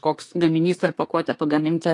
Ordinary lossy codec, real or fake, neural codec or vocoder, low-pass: AAC, 48 kbps; fake; codec, 24 kHz, 1 kbps, SNAC; 10.8 kHz